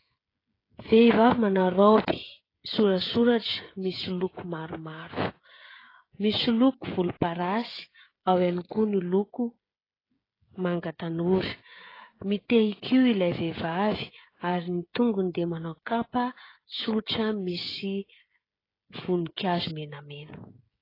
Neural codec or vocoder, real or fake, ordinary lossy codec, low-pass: codec, 16 kHz, 16 kbps, FreqCodec, smaller model; fake; AAC, 24 kbps; 5.4 kHz